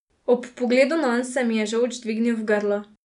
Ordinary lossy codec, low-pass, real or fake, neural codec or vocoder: none; 10.8 kHz; real; none